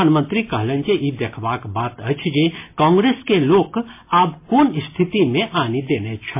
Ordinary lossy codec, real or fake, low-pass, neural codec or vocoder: MP3, 24 kbps; real; 3.6 kHz; none